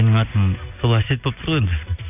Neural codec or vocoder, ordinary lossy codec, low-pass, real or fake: none; none; 3.6 kHz; real